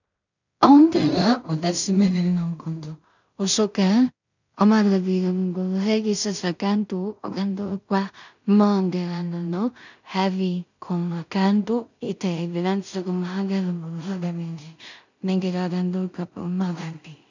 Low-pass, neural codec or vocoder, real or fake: 7.2 kHz; codec, 16 kHz in and 24 kHz out, 0.4 kbps, LongCat-Audio-Codec, two codebook decoder; fake